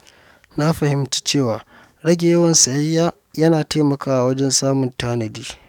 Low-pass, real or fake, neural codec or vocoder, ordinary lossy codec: 19.8 kHz; fake; codec, 44.1 kHz, 7.8 kbps, DAC; none